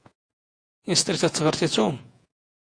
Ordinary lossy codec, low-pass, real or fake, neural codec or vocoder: AAC, 48 kbps; 9.9 kHz; fake; vocoder, 48 kHz, 128 mel bands, Vocos